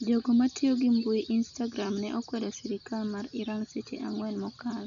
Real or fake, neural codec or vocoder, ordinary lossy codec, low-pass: real; none; none; 7.2 kHz